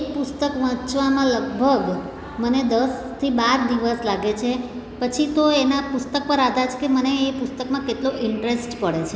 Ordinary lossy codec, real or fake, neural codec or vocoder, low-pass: none; real; none; none